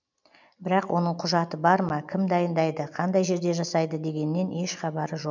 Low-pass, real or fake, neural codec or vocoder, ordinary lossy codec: 7.2 kHz; real; none; none